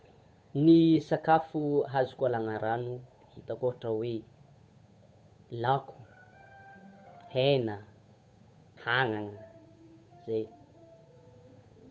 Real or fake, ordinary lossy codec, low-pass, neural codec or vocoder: fake; none; none; codec, 16 kHz, 8 kbps, FunCodec, trained on Chinese and English, 25 frames a second